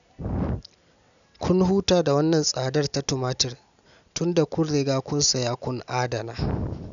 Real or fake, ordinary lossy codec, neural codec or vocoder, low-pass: real; none; none; 7.2 kHz